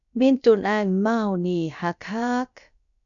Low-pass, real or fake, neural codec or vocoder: 7.2 kHz; fake; codec, 16 kHz, about 1 kbps, DyCAST, with the encoder's durations